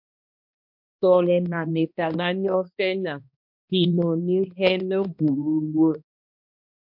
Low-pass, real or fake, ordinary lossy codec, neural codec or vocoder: 5.4 kHz; fake; MP3, 48 kbps; codec, 16 kHz, 1 kbps, X-Codec, HuBERT features, trained on balanced general audio